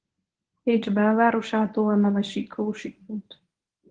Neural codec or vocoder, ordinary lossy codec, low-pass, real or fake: codec, 24 kHz, 0.9 kbps, WavTokenizer, medium speech release version 1; Opus, 16 kbps; 9.9 kHz; fake